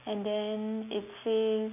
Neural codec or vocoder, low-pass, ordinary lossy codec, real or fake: none; 3.6 kHz; none; real